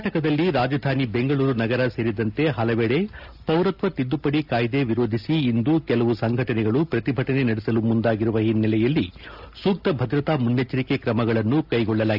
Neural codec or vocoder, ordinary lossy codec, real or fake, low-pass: none; AAC, 48 kbps; real; 5.4 kHz